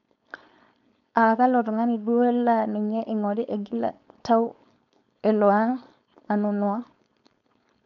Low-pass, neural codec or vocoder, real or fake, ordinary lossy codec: 7.2 kHz; codec, 16 kHz, 4.8 kbps, FACodec; fake; none